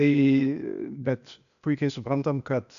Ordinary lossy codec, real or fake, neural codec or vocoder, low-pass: MP3, 96 kbps; fake; codec, 16 kHz, 0.8 kbps, ZipCodec; 7.2 kHz